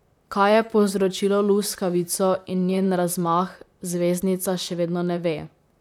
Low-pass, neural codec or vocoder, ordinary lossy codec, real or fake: 19.8 kHz; vocoder, 44.1 kHz, 128 mel bands, Pupu-Vocoder; none; fake